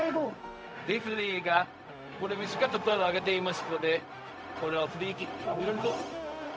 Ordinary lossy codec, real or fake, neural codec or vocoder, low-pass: none; fake; codec, 16 kHz, 0.4 kbps, LongCat-Audio-Codec; none